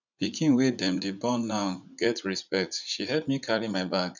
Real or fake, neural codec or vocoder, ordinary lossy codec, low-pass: fake; vocoder, 44.1 kHz, 80 mel bands, Vocos; none; 7.2 kHz